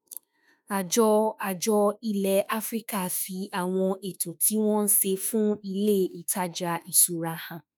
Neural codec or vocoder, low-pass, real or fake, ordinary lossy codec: autoencoder, 48 kHz, 32 numbers a frame, DAC-VAE, trained on Japanese speech; none; fake; none